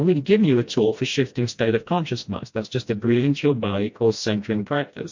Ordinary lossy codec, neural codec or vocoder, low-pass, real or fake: MP3, 48 kbps; codec, 16 kHz, 1 kbps, FreqCodec, smaller model; 7.2 kHz; fake